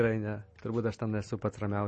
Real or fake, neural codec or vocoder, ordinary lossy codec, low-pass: real; none; MP3, 32 kbps; 10.8 kHz